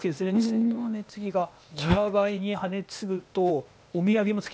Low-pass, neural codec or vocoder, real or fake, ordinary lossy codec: none; codec, 16 kHz, 0.8 kbps, ZipCodec; fake; none